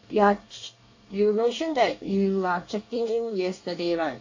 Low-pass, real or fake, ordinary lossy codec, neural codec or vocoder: 7.2 kHz; fake; AAC, 48 kbps; codec, 24 kHz, 1 kbps, SNAC